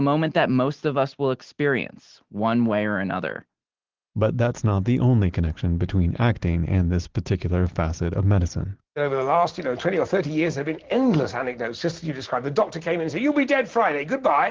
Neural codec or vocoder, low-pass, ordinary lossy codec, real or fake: none; 7.2 kHz; Opus, 16 kbps; real